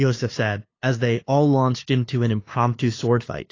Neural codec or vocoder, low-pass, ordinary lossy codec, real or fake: codec, 16 kHz, 2 kbps, FunCodec, trained on LibriTTS, 25 frames a second; 7.2 kHz; AAC, 32 kbps; fake